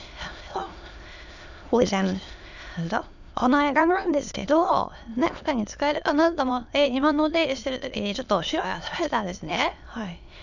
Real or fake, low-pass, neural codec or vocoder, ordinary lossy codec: fake; 7.2 kHz; autoencoder, 22.05 kHz, a latent of 192 numbers a frame, VITS, trained on many speakers; none